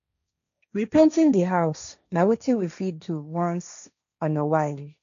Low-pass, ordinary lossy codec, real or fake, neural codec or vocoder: 7.2 kHz; none; fake; codec, 16 kHz, 1.1 kbps, Voila-Tokenizer